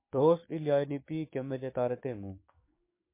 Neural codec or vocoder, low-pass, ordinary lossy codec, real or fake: codec, 16 kHz, 6 kbps, DAC; 3.6 kHz; MP3, 24 kbps; fake